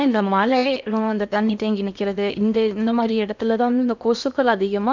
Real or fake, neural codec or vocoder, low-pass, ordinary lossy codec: fake; codec, 16 kHz in and 24 kHz out, 0.8 kbps, FocalCodec, streaming, 65536 codes; 7.2 kHz; none